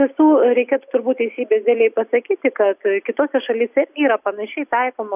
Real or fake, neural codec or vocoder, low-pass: real; none; 3.6 kHz